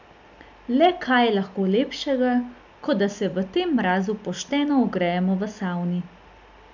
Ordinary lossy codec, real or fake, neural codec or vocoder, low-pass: none; real; none; 7.2 kHz